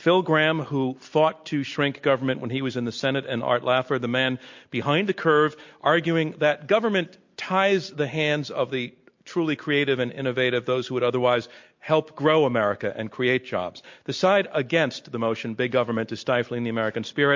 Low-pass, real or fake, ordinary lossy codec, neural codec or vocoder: 7.2 kHz; real; MP3, 48 kbps; none